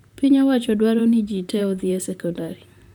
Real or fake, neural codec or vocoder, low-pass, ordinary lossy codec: fake; vocoder, 44.1 kHz, 128 mel bands, Pupu-Vocoder; 19.8 kHz; none